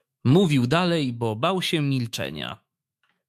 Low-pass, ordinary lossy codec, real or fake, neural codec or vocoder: 14.4 kHz; MP3, 96 kbps; fake; autoencoder, 48 kHz, 128 numbers a frame, DAC-VAE, trained on Japanese speech